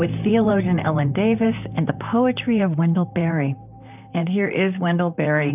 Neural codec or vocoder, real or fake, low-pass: vocoder, 44.1 kHz, 128 mel bands, Pupu-Vocoder; fake; 3.6 kHz